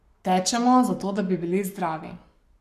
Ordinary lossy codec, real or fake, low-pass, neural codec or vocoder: none; fake; 14.4 kHz; codec, 44.1 kHz, 7.8 kbps, Pupu-Codec